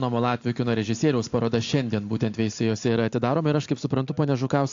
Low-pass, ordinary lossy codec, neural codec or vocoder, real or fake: 7.2 kHz; AAC, 64 kbps; none; real